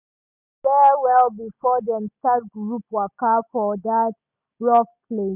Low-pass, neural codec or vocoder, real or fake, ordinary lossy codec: 3.6 kHz; none; real; none